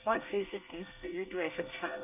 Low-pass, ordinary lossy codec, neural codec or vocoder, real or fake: 3.6 kHz; none; codec, 24 kHz, 1 kbps, SNAC; fake